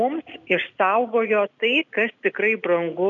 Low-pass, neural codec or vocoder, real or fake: 7.2 kHz; none; real